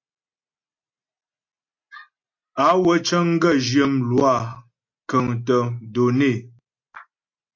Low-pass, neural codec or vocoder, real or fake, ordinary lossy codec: 7.2 kHz; none; real; MP3, 48 kbps